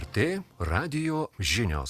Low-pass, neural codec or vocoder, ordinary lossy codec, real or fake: 14.4 kHz; none; Opus, 64 kbps; real